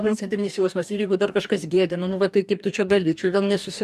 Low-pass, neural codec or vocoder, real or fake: 14.4 kHz; codec, 44.1 kHz, 2.6 kbps, DAC; fake